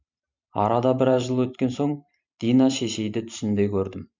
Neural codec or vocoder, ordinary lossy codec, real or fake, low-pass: vocoder, 44.1 kHz, 128 mel bands every 512 samples, BigVGAN v2; MP3, 64 kbps; fake; 7.2 kHz